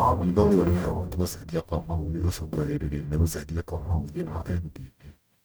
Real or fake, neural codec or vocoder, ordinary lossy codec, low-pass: fake; codec, 44.1 kHz, 0.9 kbps, DAC; none; none